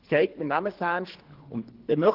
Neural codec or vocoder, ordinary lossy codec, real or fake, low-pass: codec, 24 kHz, 3 kbps, HILCodec; Opus, 16 kbps; fake; 5.4 kHz